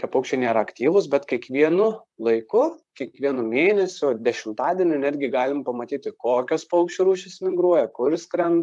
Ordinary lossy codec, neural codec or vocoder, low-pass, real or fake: MP3, 96 kbps; vocoder, 44.1 kHz, 128 mel bands, Pupu-Vocoder; 10.8 kHz; fake